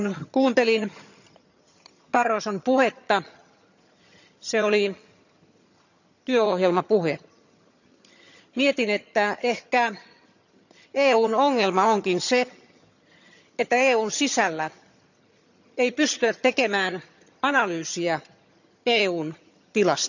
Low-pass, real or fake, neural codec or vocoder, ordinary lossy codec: 7.2 kHz; fake; vocoder, 22.05 kHz, 80 mel bands, HiFi-GAN; none